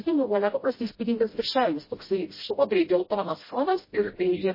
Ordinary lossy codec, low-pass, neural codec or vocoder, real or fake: MP3, 24 kbps; 5.4 kHz; codec, 16 kHz, 0.5 kbps, FreqCodec, smaller model; fake